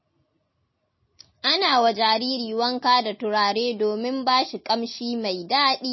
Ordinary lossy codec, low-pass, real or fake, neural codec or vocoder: MP3, 24 kbps; 7.2 kHz; real; none